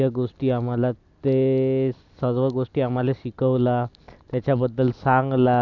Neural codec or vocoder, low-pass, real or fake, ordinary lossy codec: none; 7.2 kHz; real; none